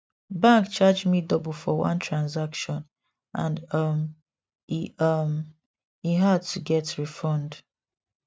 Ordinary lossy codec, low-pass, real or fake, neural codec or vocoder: none; none; real; none